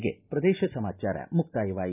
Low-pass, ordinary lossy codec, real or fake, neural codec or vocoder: 3.6 kHz; none; real; none